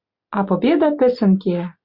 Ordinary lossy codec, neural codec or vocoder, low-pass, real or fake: Opus, 64 kbps; none; 5.4 kHz; real